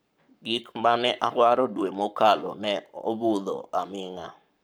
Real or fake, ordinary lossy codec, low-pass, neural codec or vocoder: fake; none; none; codec, 44.1 kHz, 7.8 kbps, Pupu-Codec